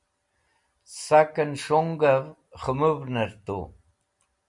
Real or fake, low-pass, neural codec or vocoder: real; 10.8 kHz; none